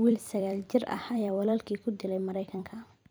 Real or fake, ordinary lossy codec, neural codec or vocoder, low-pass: fake; none; vocoder, 44.1 kHz, 128 mel bands every 256 samples, BigVGAN v2; none